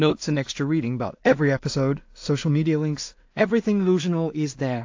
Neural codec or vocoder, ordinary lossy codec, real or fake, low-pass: codec, 16 kHz in and 24 kHz out, 0.4 kbps, LongCat-Audio-Codec, two codebook decoder; AAC, 48 kbps; fake; 7.2 kHz